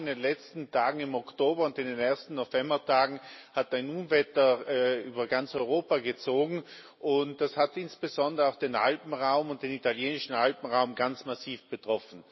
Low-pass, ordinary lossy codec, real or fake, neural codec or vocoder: 7.2 kHz; MP3, 24 kbps; real; none